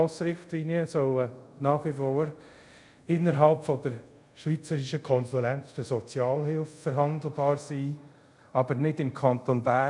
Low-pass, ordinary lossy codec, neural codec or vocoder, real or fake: 10.8 kHz; none; codec, 24 kHz, 0.5 kbps, DualCodec; fake